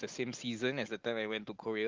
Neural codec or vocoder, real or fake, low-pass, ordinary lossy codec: none; real; 7.2 kHz; Opus, 32 kbps